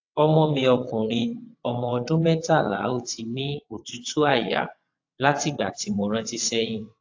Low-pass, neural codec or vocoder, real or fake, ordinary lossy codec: 7.2 kHz; vocoder, 22.05 kHz, 80 mel bands, WaveNeXt; fake; AAC, 48 kbps